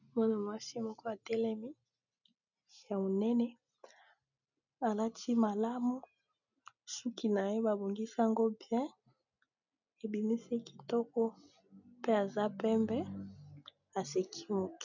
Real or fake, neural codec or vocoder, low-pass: real; none; 7.2 kHz